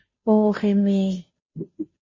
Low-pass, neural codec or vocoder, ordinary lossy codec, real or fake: 7.2 kHz; codec, 16 kHz, 0.5 kbps, FunCodec, trained on Chinese and English, 25 frames a second; MP3, 32 kbps; fake